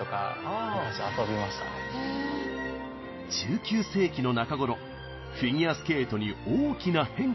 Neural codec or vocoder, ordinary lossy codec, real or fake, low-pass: none; MP3, 24 kbps; real; 7.2 kHz